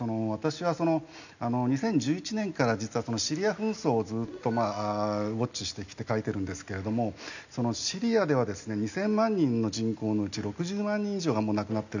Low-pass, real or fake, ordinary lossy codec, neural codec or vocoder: 7.2 kHz; real; none; none